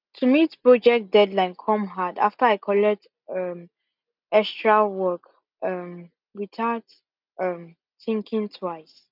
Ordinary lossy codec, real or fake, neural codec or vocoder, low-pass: none; real; none; 5.4 kHz